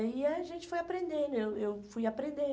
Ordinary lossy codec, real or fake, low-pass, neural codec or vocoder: none; real; none; none